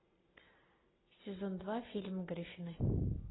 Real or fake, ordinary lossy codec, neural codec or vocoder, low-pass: real; AAC, 16 kbps; none; 7.2 kHz